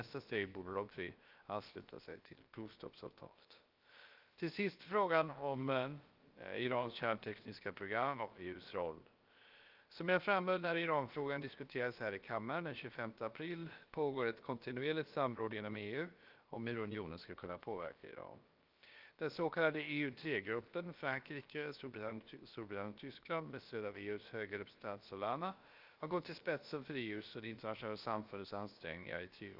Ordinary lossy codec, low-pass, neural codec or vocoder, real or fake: Opus, 32 kbps; 5.4 kHz; codec, 16 kHz, about 1 kbps, DyCAST, with the encoder's durations; fake